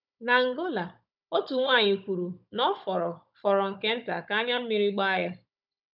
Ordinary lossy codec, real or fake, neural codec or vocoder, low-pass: none; fake; codec, 16 kHz, 16 kbps, FunCodec, trained on Chinese and English, 50 frames a second; 5.4 kHz